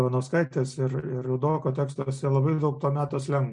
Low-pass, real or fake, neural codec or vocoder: 9.9 kHz; real; none